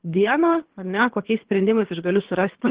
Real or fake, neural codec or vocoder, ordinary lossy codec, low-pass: fake; codec, 24 kHz, 3 kbps, HILCodec; Opus, 16 kbps; 3.6 kHz